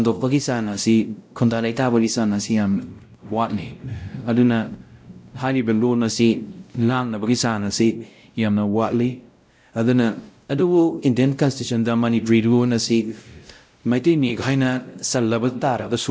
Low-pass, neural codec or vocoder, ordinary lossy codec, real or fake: none; codec, 16 kHz, 0.5 kbps, X-Codec, WavLM features, trained on Multilingual LibriSpeech; none; fake